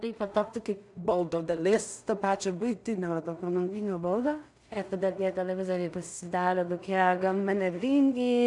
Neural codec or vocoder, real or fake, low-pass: codec, 16 kHz in and 24 kHz out, 0.4 kbps, LongCat-Audio-Codec, two codebook decoder; fake; 10.8 kHz